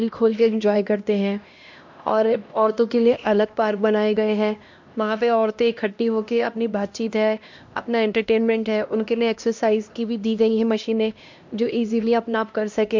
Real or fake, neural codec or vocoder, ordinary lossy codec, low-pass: fake; codec, 16 kHz, 1 kbps, X-Codec, HuBERT features, trained on LibriSpeech; MP3, 48 kbps; 7.2 kHz